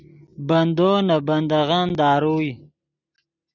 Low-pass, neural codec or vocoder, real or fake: 7.2 kHz; none; real